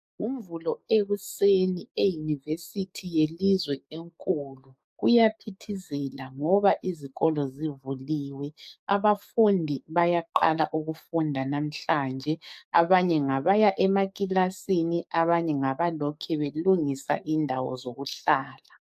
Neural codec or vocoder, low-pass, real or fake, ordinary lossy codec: codec, 44.1 kHz, 7.8 kbps, DAC; 14.4 kHz; fake; AAC, 96 kbps